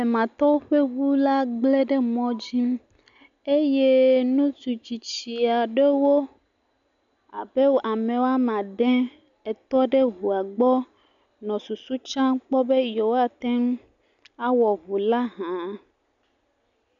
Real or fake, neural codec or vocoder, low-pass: real; none; 7.2 kHz